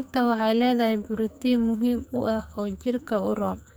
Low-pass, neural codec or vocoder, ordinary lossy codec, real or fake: none; codec, 44.1 kHz, 2.6 kbps, SNAC; none; fake